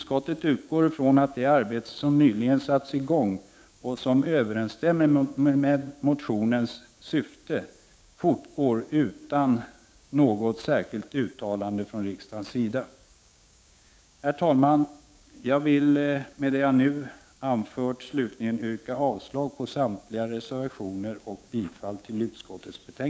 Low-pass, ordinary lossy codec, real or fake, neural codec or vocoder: none; none; fake; codec, 16 kHz, 6 kbps, DAC